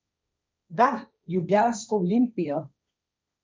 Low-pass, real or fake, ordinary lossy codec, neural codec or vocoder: none; fake; none; codec, 16 kHz, 1.1 kbps, Voila-Tokenizer